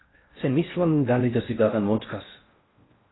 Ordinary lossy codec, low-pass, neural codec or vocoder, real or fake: AAC, 16 kbps; 7.2 kHz; codec, 16 kHz in and 24 kHz out, 0.6 kbps, FocalCodec, streaming, 4096 codes; fake